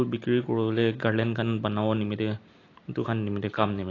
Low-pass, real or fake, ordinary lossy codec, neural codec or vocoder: 7.2 kHz; real; AAC, 32 kbps; none